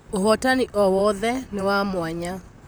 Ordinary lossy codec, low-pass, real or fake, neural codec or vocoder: none; none; fake; vocoder, 44.1 kHz, 128 mel bands, Pupu-Vocoder